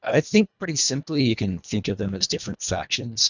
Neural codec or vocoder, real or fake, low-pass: codec, 24 kHz, 1.5 kbps, HILCodec; fake; 7.2 kHz